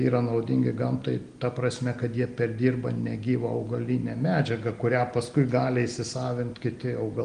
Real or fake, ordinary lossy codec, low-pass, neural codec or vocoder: real; Opus, 24 kbps; 9.9 kHz; none